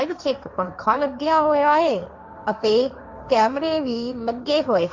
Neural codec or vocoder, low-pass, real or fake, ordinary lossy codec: codec, 16 kHz, 1.1 kbps, Voila-Tokenizer; 7.2 kHz; fake; MP3, 64 kbps